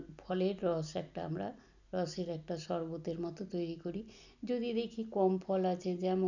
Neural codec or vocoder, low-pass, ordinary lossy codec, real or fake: none; 7.2 kHz; none; real